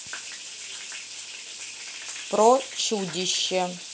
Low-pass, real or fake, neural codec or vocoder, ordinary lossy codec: none; real; none; none